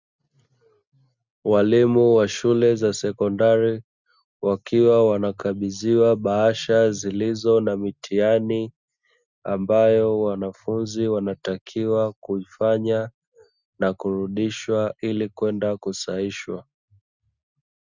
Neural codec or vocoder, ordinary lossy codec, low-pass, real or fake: none; Opus, 64 kbps; 7.2 kHz; real